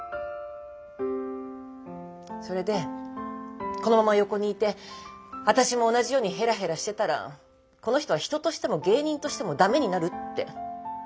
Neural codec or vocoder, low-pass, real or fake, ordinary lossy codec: none; none; real; none